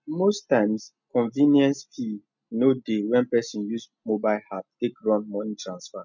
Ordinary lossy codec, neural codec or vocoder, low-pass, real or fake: none; none; 7.2 kHz; real